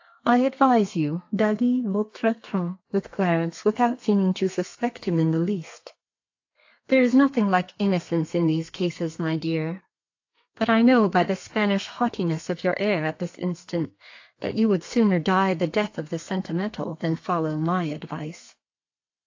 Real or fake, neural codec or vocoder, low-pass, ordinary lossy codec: fake; codec, 32 kHz, 1.9 kbps, SNAC; 7.2 kHz; AAC, 48 kbps